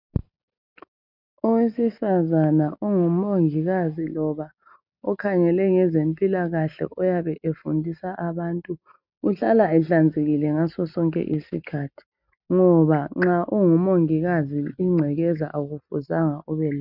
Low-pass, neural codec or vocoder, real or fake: 5.4 kHz; none; real